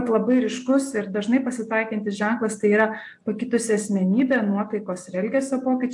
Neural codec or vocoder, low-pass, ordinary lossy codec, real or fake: none; 10.8 kHz; AAC, 64 kbps; real